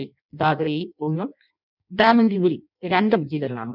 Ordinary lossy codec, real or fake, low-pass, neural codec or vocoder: none; fake; 5.4 kHz; codec, 16 kHz in and 24 kHz out, 0.6 kbps, FireRedTTS-2 codec